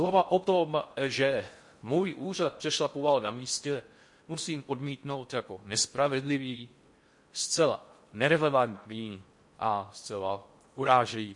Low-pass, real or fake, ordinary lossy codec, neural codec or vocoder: 10.8 kHz; fake; MP3, 48 kbps; codec, 16 kHz in and 24 kHz out, 0.6 kbps, FocalCodec, streaming, 4096 codes